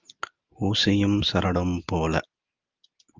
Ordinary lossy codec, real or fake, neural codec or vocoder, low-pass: Opus, 32 kbps; real; none; 7.2 kHz